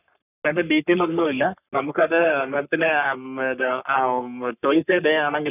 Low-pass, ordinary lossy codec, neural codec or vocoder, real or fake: 3.6 kHz; none; codec, 44.1 kHz, 3.4 kbps, Pupu-Codec; fake